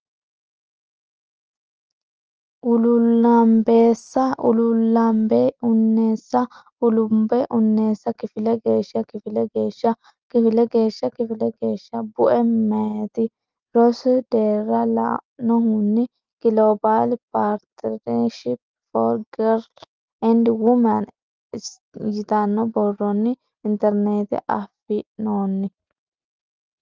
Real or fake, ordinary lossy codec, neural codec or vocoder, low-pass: real; Opus, 24 kbps; none; 7.2 kHz